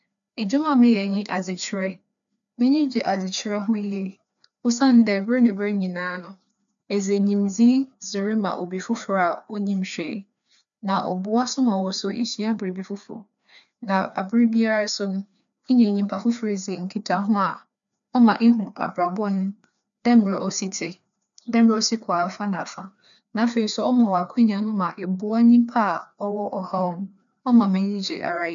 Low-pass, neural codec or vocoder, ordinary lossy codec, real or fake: 7.2 kHz; codec, 16 kHz, 2 kbps, FreqCodec, larger model; none; fake